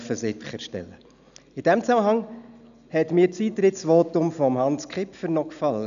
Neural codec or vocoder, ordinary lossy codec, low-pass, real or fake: none; none; 7.2 kHz; real